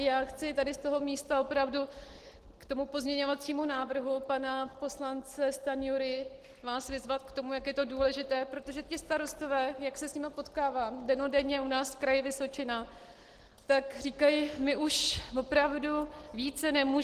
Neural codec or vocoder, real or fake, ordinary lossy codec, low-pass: none; real; Opus, 16 kbps; 14.4 kHz